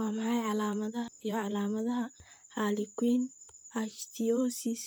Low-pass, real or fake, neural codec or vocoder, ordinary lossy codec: none; fake; vocoder, 44.1 kHz, 128 mel bands, Pupu-Vocoder; none